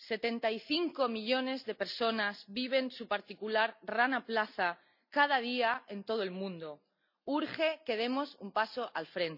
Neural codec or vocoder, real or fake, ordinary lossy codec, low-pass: none; real; none; 5.4 kHz